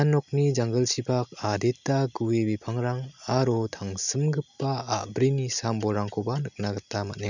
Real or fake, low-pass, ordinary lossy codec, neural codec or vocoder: real; 7.2 kHz; none; none